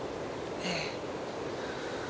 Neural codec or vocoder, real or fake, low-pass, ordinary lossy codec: none; real; none; none